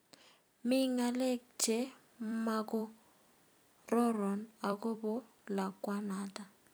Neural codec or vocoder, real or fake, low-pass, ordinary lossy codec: vocoder, 44.1 kHz, 128 mel bands every 256 samples, BigVGAN v2; fake; none; none